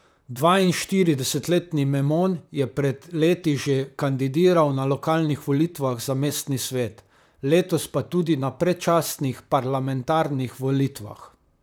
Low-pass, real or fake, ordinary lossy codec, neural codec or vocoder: none; fake; none; vocoder, 44.1 kHz, 128 mel bands, Pupu-Vocoder